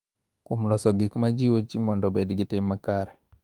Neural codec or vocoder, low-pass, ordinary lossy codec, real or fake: autoencoder, 48 kHz, 32 numbers a frame, DAC-VAE, trained on Japanese speech; 19.8 kHz; Opus, 32 kbps; fake